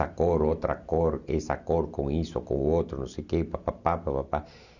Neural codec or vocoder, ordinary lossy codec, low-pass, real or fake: none; none; 7.2 kHz; real